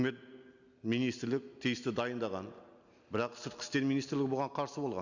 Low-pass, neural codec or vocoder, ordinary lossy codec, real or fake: 7.2 kHz; none; none; real